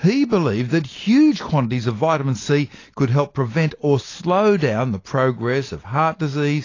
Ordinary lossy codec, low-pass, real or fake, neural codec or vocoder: AAC, 32 kbps; 7.2 kHz; real; none